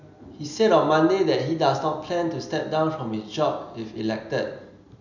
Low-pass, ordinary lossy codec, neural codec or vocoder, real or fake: 7.2 kHz; none; none; real